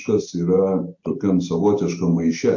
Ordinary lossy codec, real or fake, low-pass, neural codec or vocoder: MP3, 48 kbps; real; 7.2 kHz; none